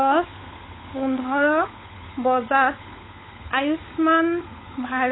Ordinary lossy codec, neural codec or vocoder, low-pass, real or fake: AAC, 16 kbps; codec, 16 kHz, 16 kbps, FunCodec, trained on LibriTTS, 50 frames a second; 7.2 kHz; fake